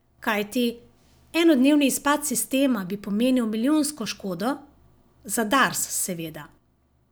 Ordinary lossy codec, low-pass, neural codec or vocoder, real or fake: none; none; none; real